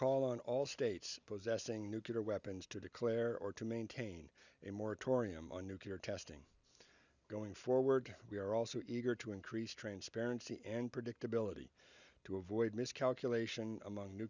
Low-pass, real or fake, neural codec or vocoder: 7.2 kHz; real; none